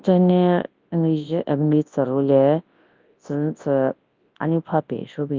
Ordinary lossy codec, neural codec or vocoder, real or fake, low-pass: Opus, 32 kbps; codec, 24 kHz, 0.9 kbps, WavTokenizer, large speech release; fake; 7.2 kHz